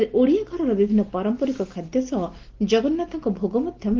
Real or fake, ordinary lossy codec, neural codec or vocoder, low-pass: real; Opus, 16 kbps; none; 7.2 kHz